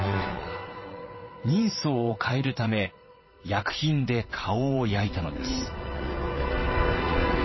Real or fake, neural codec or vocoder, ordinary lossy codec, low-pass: fake; vocoder, 22.05 kHz, 80 mel bands, WaveNeXt; MP3, 24 kbps; 7.2 kHz